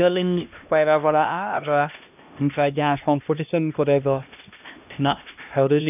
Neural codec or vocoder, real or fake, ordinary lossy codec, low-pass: codec, 16 kHz, 1 kbps, X-Codec, HuBERT features, trained on LibriSpeech; fake; none; 3.6 kHz